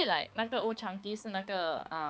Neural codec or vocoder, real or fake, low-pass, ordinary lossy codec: codec, 16 kHz, 4 kbps, X-Codec, HuBERT features, trained on balanced general audio; fake; none; none